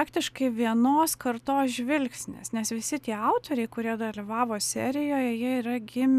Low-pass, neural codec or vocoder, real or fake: 14.4 kHz; none; real